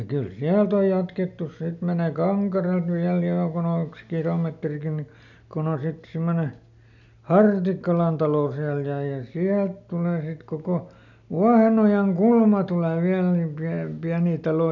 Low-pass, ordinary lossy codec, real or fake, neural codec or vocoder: 7.2 kHz; none; real; none